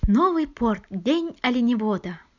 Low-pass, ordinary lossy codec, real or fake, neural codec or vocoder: 7.2 kHz; none; real; none